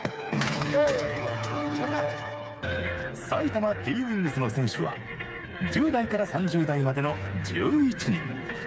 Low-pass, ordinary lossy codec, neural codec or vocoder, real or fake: none; none; codec, 16 kHz, 4 kbps, FreqCodec, smaller model; fake